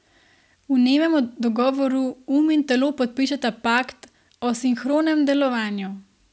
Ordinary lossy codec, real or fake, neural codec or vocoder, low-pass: none; real; none; none